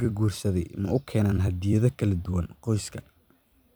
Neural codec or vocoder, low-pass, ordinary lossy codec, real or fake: vocoder, 44.1 kHz, 128 mel bands every 256 samples, BigVGAN v2; none; none; fake